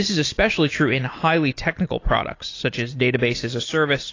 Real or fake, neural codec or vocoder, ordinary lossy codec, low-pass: real; none; AAC, 32 kbps; 7.2 kHz